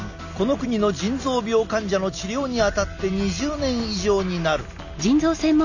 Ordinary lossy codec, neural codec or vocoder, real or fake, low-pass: none; none; real; 7.2 kHz